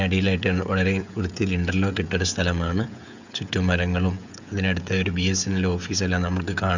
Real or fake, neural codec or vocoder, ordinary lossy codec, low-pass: fake; codec, 16 kHz, 16 kbps, FreqCodec, smaller model; none; 7.2 kHz